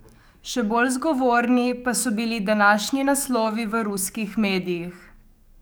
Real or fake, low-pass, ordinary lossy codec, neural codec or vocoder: fake; none; none; codec, 44.1 kHz, 7.8 kbps, DAC